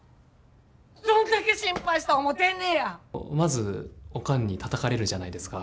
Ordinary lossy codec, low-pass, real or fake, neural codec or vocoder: none; none; real; none